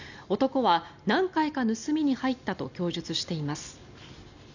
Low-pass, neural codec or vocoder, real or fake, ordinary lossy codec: 7.2 kHz; none; real; none